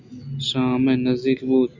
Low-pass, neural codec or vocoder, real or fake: 7.2 kHz; none; real